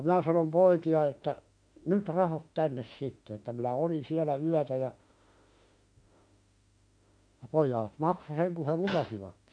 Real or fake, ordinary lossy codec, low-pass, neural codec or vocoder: fake; MP3, 48 kbps; 9.9 kHz; autoencoder, 48 kHz, 32 numbers a frame, DAC-VAE, trained on Japanese speech